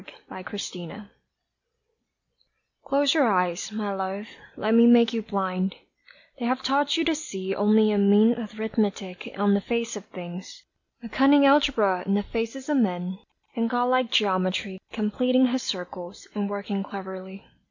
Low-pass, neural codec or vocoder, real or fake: 7.2 kHz; none; real